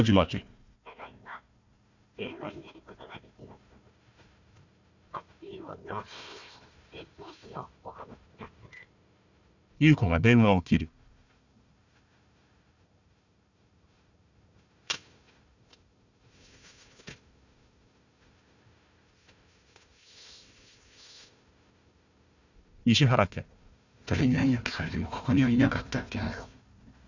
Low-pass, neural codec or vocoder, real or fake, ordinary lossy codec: 7.2 kHz; codec, 16 kHz, 1 kbps, FunCodec, trained on Chinese and English, 50 frames a second; fake; none